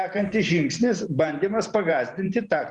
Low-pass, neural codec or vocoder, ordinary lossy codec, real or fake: 10.8 kHz; vocoder, 44.1 kHz, 128 mel bands every 512 samples, BigVGAN v2; Opus, 64 kbps; fake